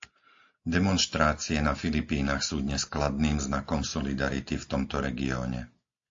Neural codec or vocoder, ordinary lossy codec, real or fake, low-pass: none; AAC, 48 kbps; real; 7.2 kHz